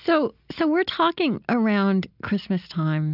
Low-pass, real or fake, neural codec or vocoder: 5.4 kHz; real; none